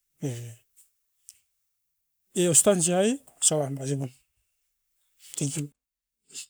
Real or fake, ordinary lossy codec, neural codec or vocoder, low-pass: fake; none; codec, 44.1 kHz, 7.8 kbps, Pupu-Codec; none